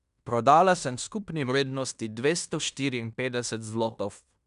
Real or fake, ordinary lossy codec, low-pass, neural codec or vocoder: fake; none; 10.8 kHz; codec, 16 kHz in and 24 kHz out, 0.9 kbps, LongCat-Audio-Codec, fine tuned four codebook decoder